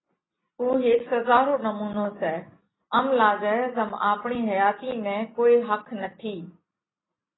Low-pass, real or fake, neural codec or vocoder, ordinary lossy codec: 7.2 kHz; real; none; AAC, 16 kbps